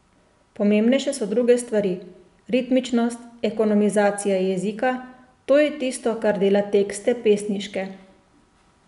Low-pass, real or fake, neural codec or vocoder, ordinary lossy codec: 10.8 kHz; real; none; none